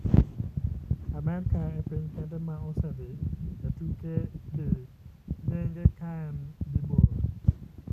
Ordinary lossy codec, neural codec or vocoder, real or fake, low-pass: none; none; real; 14.4 kHz